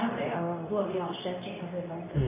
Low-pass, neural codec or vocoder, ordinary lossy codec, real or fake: 3.6 kHz; codec, 24 kHz, 0.9 kbps, WavTokenizer, medium speech release version 2; MP3, 16 kbps; fake